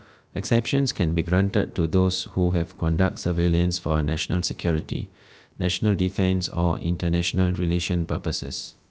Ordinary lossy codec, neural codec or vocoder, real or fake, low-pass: none; codec, 16 kHz, about 1 kbps, DyCAST, with the encoder's durations; fake; none